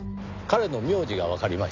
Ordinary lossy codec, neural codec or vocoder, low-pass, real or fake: none; none; 7.2 kHz; real